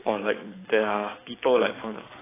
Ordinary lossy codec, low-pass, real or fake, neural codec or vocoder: AAC, 16 kbps; 3.6 kHz; fake; codec, 44.1 kHz, 7.8 kbps, DAC